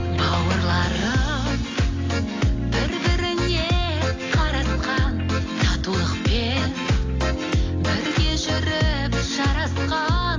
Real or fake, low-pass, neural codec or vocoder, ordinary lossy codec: real; 7.2 kHz; none; AAC, 32 kbps